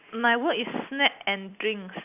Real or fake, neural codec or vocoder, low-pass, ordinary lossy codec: real; none; 3.6 kHz; none